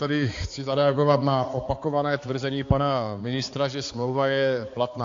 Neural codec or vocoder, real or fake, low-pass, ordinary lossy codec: codec, 16 kHz, 4 kbps, X-Codec, HuBERT features, trained on balanced general audio; fake; 7.2 kHz; AAC, 48 kbps